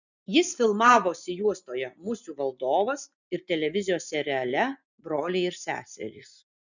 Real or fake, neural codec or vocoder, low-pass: real; none; 7.2 kHz